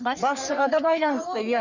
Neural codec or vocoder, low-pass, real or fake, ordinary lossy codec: codec, 44.1 kHz, 3.4 kbps, Pupu-Codec; 7.2 kHz; fake; none